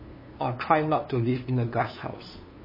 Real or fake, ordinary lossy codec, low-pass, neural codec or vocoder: fake; MP3, 24 kbps; 5.4 kHz; codec, 16 kHz, 2 kbps, FunCodec, trained on LibriTTS, 25 frames a second